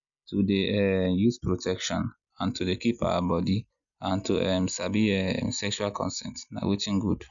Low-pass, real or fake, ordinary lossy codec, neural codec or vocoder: 7.2 kHz; real; none; none